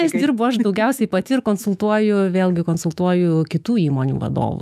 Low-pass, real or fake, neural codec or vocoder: 14.4 kHz; fake; autoencoder, 48 kHz, 128 numbers a frame, DAC-VAE, trained on Japanese speech